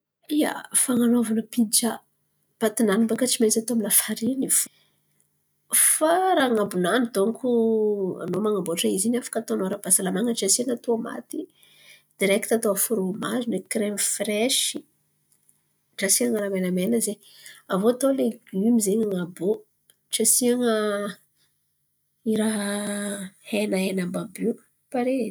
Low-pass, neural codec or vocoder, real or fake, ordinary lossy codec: none; none; real; none